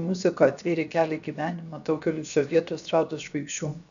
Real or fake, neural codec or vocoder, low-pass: fake; codec, 16 kHz, 0.7 kbps, FocalCodec; 7.2 kHz